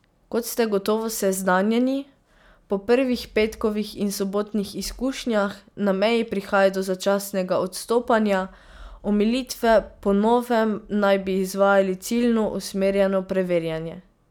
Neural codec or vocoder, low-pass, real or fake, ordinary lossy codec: none; 19.8 kHz; real; none